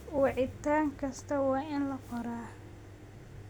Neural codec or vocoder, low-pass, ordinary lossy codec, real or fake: none; none; none; real